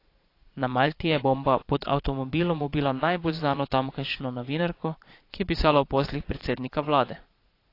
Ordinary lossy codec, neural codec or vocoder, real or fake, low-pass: AAC, 32 kbps; vocoder, 22.05 kHz, 80 mel bands, Vocos; fake; 5.4 kHz